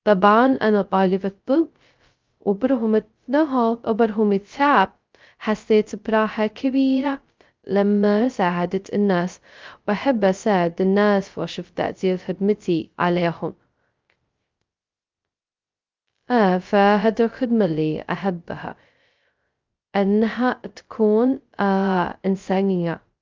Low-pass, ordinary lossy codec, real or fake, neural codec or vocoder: 7.2 kHz; Opus, 24 kbps; fake; codec, 16 kHz, 0.2 kbps, FocalCodec